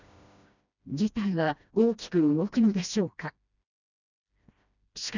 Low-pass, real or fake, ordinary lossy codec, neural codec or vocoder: 7.2 kHz; fake; none; codec, 16 kHz, 1 kbps, FreqCodec, smaller model